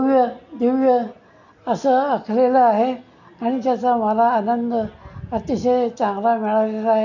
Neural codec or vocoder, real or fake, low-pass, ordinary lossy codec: none; real; 7.2 kHz; none